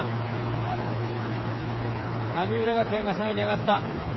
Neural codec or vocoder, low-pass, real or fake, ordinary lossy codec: codec, 16 kHz, 4 kbps, FreqCodec, smaller model; 7.2 kHz; fake; MP3, 24 kbps